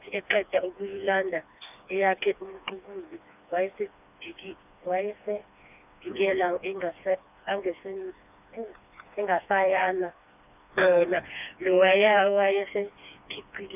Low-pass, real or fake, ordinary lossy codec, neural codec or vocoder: 3.6 kHz; fake; none; codec, 16 kHz, 2 kbps, FreqCodec, smaller model